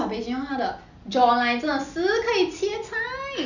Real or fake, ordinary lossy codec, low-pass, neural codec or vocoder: real; none; 7.2 kHz; none